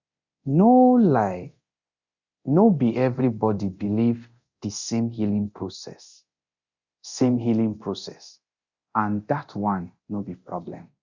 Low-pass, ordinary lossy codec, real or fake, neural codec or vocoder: 7.2 kHz; Opus, 64 kbps; fake; codec, 24 kHz, 0.9 kbps, DualCodec